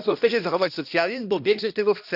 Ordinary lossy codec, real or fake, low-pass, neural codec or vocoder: none; fake; 5.4 kHz; codec, 16 kHz, 1 kbps, X-Codec, HuBERT features, trained on balanced general audio